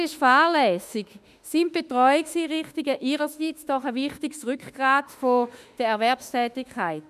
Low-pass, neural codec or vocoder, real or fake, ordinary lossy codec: 14.4 kHz; autoencoder, 48 kHz, 32 numbers a frame, DAC-VAE, trained on Japanese speech; fake; none